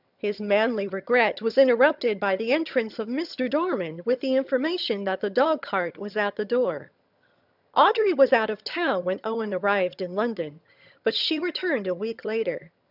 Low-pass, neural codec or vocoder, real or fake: 5.4 kHz; vocoder, 22.05 kHz, 80 mel bands, HiFi-GAN; fake